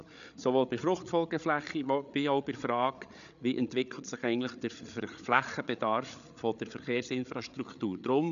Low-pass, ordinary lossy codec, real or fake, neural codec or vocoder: 7.2 kHz; none; fake; codec, 16 kHz, 8 kbps, FreqCodec, larger model